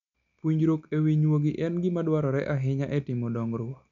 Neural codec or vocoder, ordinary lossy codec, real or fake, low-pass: none; none; real; 7.2 kHz